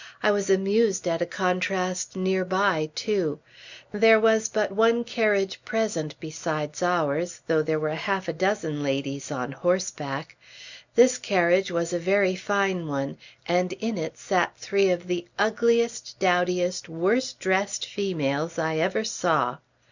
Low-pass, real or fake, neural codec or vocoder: 7.2 kHz; real; none